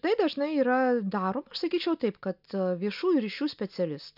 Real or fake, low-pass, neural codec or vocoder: real; 5.4 kHz; none